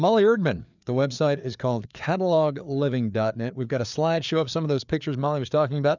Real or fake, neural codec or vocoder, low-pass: fake; codec, 16 kHz, 4 kbps, FreqCodec, larger model; 7.2 kHz